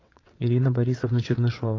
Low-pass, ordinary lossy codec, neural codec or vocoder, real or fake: 7.2 kHz; AAC, 32 kbps; vocoder, 22.05 kHz, 80 mel bands, WaveNeXt; fake